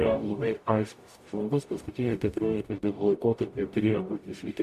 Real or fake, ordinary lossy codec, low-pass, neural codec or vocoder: fake; MP3, 64 kbps; 14.4 kHz; codec, 44.1 kHz, 0.9 kbps, DAC